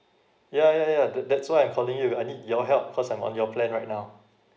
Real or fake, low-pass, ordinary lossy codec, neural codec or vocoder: real; none; none; none